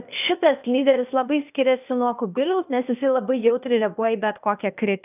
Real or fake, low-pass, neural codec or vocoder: fake; 3.6 kHz; codec, 16 kHz, 0.8 kbps, ZipCodec